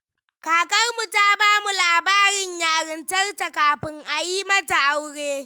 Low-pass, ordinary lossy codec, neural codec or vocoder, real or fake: 19.8 kHz; Opus, 32 kbps; autoencoder, 48 kHz, 128 numbers a frame, DAC-VAE, trained on Japanese speech; fake